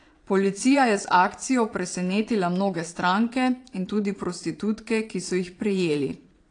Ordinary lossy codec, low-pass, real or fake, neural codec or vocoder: AAC, 48 kbps; 9.9 kHz; fake; vocoder, 22.05 kHz, 80 mel bands, Vocos